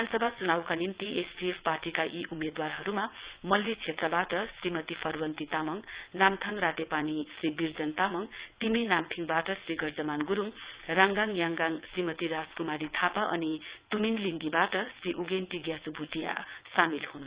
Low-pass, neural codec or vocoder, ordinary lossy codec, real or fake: 3.6 kHz; vocoder, 22.05 kHz, 80 mel bands, WaveNeXt; Opus, 64 kbps; fake